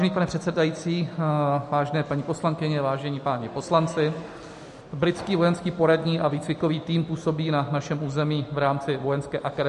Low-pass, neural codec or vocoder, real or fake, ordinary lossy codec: 14.4 kHz; none; real; MP3, 48 kbps